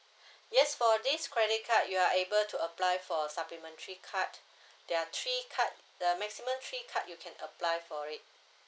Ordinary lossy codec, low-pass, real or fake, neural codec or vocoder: none; none; real; none